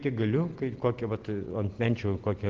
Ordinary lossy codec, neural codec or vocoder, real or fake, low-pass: Opus, 16 kbps; none; real; 7.2 kHz